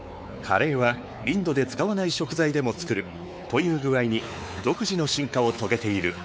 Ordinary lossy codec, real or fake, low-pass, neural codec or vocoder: none; fake; none; codec, 16 kHz, 4 kbps, X-Codec, WavLM features, trained on Multilingual LibriSpeech